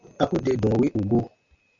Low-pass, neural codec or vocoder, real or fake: 7.2 kHz; none; real